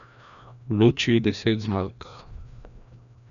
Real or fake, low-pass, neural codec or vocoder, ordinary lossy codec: fake; 7.2 kHz; codec, 16 kHz, 1 kbps, FreqCodec, larger model; none